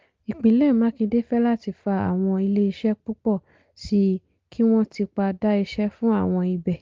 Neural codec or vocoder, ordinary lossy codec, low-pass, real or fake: none; Opus, 32 kbps; 7.2 kHz; real